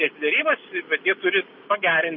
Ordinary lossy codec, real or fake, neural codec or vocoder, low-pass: MP3, 32 kbps; real; none; 7.2 kHz